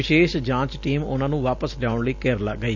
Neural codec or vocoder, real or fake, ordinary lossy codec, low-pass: none; real; none; 7.2 kHz